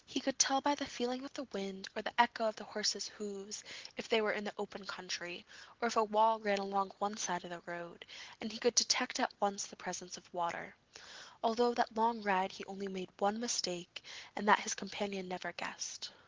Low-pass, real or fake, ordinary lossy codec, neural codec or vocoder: 7.2 kHz; real; Opus, 16 kbps; none